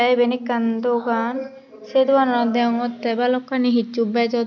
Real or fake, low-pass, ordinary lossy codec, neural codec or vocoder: real; 7.2 kHz; none; none